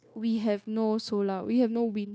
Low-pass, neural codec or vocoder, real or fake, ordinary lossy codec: none; codec, 16 kHz, 0.9 kbps, LongCat-Audio-Codec; fake; none